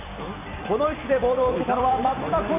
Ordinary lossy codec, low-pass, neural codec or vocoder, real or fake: none; 3.6 kHz; vocoder, 44.1 kHz, 128 mel bands every 256 samples, BigVGAN v2; fake